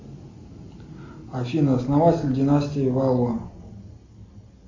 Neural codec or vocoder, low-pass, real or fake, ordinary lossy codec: none; 7.2 kHz; real; AAC, 48 kbps